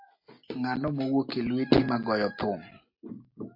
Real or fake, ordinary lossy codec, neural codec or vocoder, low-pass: real; MP3, 32 kbps; none; 5.4 kHz